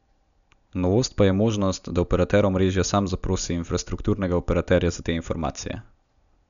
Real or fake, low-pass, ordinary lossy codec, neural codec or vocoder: real; 7.2 kHz; none; none